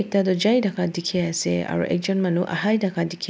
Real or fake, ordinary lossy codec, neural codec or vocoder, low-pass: real; none; none; none